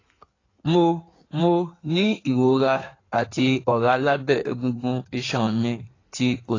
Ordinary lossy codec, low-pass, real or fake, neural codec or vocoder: AAC, 32 kbps; 7.2 kHz; fake; codec, 16 kHz in and 24 kHz out, 1.1 kbps, FireRedTTS-2 codec